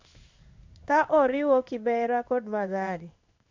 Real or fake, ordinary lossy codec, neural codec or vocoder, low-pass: fake; MP3, 64 kbps; codec, 16 kHz in and 24 kHz out, 1 kbps, XY-Tokenizer; 7.2 kHz